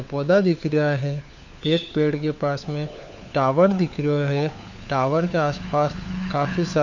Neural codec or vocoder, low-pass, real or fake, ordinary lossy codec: codec, 16 kHz, 4 kbps, FunCodec, trained on LibriTTS, 50 frames a second; 7.2 kHz; fake; none